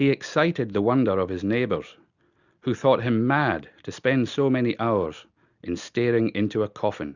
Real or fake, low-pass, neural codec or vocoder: real; 7.2 kHz; none